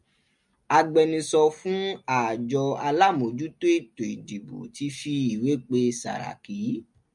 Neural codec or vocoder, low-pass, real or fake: none; 10.8 kHz; real